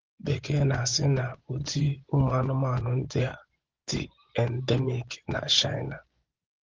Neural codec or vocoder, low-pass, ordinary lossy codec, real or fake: vocoder, 24 kHz, 100 mel bands, Vocos; 7.2 kHz; Opus, 16 kbps; fake